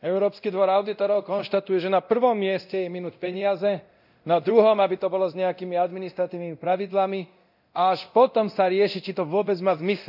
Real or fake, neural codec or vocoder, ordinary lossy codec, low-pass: fake; codec, 24 kHz, 0.9 kbps, DualCodec; none; 5.4 kHz